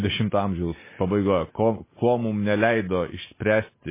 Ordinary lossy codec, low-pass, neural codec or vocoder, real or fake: MP3, 16 kbps; 3.6 kHz; none; real